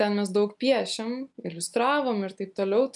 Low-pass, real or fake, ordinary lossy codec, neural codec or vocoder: 10.8 kHz; real; MP3, 96 kbps; none